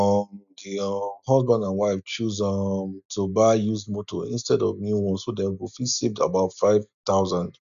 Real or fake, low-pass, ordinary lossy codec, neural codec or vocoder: real; 7.2 kHz; none; none